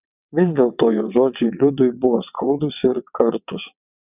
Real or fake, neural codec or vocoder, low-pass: fake; vocoder, 22.05 kHz, 80 mel bands, WaveNeXt; 3.6 kHz